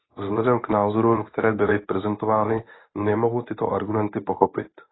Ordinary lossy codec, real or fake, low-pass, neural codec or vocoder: AAC, 16 kbps; fake; 7.2 kHz; codec, 24 kHz, 0.9 kbps, WavTokenizer, medium speech release version 1